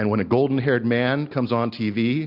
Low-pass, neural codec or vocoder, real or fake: 5.4 kHz; none; real